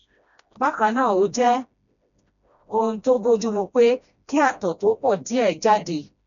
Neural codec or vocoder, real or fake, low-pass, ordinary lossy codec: codec, 16 kHz, 1 kbps, FreqCodec, smaller model; fake; 7.2 kHz; none